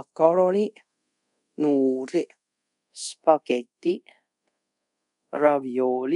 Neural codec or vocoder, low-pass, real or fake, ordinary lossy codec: codec, 24 kHz, 0.5 kbps, DualCodec; 10.8 kHz; fake; none